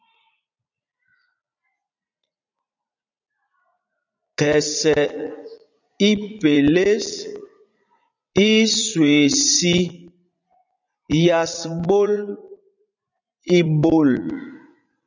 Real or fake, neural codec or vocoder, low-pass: fake; vocoder, 44.1 kHz, 80 mel bands, Vocos; 7.2 kHz